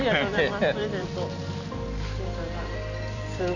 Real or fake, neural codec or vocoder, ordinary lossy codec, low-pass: fake; vocoder, 44.1 kHz, 128 mel bands every 256 samples, BigVGAN v2; none; 7.2 kHz